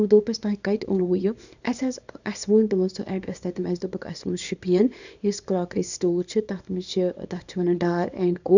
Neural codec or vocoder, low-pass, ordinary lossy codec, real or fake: codec, 24 kHz, 0.9 kbps, WavTokenizer, small release; 7.2 kHz; none; fake